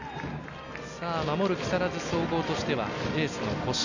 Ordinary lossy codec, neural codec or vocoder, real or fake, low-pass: none; none; real; 7.2 kHz